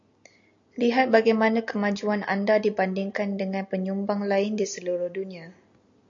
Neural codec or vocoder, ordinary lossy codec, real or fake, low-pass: none; AAC, 64 kbps; real; 7.2 kHz